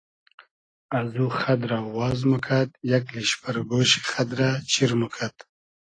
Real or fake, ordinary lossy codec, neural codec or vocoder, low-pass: real; AAC, 32 kbps; none; 9.9 kHz